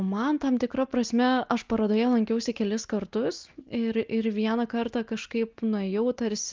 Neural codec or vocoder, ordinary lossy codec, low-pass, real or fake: none; Opus, 24 kbps; 7.2 kHz; real